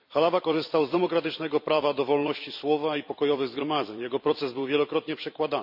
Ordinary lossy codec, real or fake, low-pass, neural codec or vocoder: none; fake; 5.4 kHz; vocoder, 44.1 kHz, 128 mel bands every 256 samples, BigVGAN v2